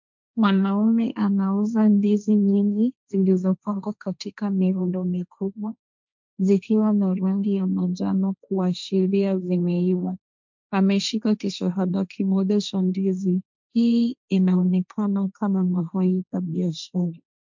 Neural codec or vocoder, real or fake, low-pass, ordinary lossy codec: codec, 16 kHz, 1.1 kbps, Voila-Tokenizer; fake; 7.2 kHz; MP3, 64 kbps